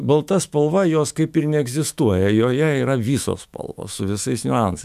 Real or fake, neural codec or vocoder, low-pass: fake; autoencoder, 48 kHz, 128 numbers a frame, DAC-VAE, trained on Japanese speech; 14.4 kHz